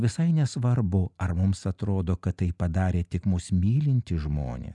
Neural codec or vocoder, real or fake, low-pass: none; real; 10.8 kHz